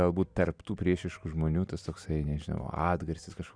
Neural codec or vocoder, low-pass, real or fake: none; 9.9 kHz; real